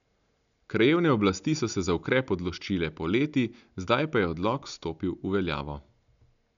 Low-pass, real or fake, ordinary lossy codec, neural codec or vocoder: 7.2 kHz; real; none; none